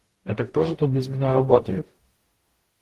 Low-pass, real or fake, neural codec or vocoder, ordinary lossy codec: 14.4 kHz; fake; codec, 44.1 kHz, 0.9 kbps, DAC; Opus, 16 kbps